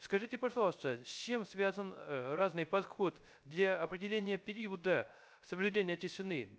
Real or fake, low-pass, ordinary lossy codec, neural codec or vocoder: fake; none; none; codec, 16 kHz, 0.3 kbps, FocalCodec